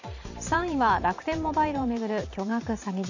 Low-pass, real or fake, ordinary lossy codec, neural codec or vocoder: 7.2 kHz; fake; none; vocoder, 44.1 kHz, 128 mel bands every 512 samples, BigVGAN v2